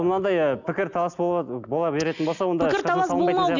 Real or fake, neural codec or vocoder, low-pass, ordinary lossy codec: real; none; 7.2 kHz; none